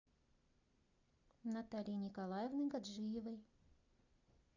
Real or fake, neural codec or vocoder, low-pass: real; none; 7.2 kHz